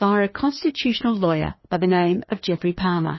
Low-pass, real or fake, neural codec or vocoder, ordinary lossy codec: 7.2 kHz; fake; codec, 16 kHz, 2 kbps, FreqCodec, larger model; MP3, 24 kbps